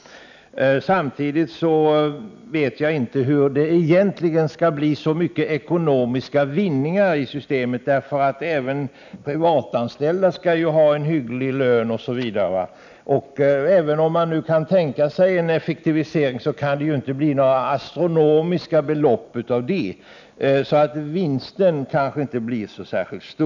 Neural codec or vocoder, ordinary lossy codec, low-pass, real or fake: none; none; 7.2 kHz; real